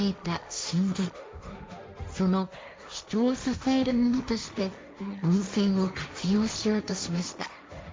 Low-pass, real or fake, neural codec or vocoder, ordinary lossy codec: none; fake; codec, 16 kHz, 1.1 kbps, Voila-Tokenizer; none